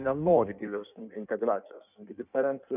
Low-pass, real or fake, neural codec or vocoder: 3.6 kHz; fake; codec, 16 kHz in and 24 kHz out, 1.1 kbps, FireRedTTS-2 codec